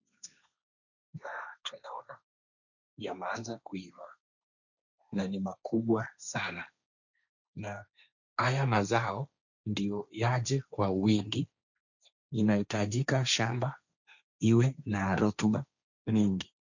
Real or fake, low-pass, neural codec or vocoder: fake; 7.2 kHz; codec, 16 kHz, 1.1 kbps, Voila-Tokenizer